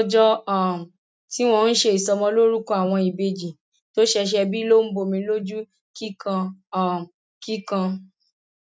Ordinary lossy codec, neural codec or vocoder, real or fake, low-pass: none; none; real; none